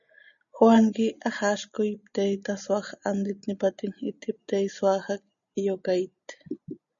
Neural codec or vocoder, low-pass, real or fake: none; 7.2 kHz; real